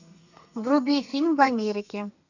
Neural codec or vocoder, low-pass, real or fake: codec, 32 kHz, 1.9 kbps, SNAC; 7.2 kHz; fake